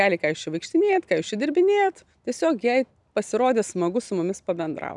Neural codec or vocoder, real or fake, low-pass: none; real; 10.8 kHz